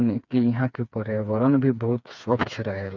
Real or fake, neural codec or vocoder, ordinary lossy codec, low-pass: fake; codec, 16 kHz, 4 kbps, FreqCodec, smaller model; none; 7.2 kHz